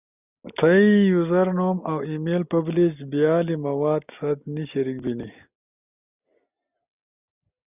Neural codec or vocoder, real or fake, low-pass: none; real; 3.6 kHz